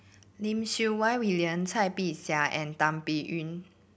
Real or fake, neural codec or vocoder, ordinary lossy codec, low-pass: real; none; none; none